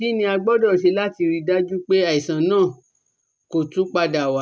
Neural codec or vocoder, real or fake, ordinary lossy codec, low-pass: none; real; none; none